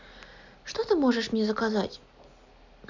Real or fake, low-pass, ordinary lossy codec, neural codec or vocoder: real; 7.2 kHz; none; none